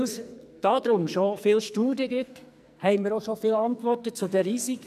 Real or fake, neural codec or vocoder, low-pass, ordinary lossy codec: fake; codec, 44.1 kHz, 2.6 kbps, SNAC; 14.4 kHz; none